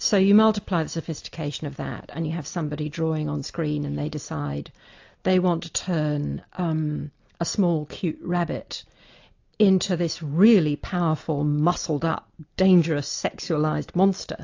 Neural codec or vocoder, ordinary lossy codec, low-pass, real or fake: none; AAC, 48 kbps; 7.2 kHz; real